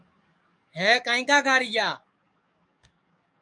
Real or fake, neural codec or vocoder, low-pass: fake; codec, 44.1 kHz, 7.8 kbps, DAC; 9.9 kHz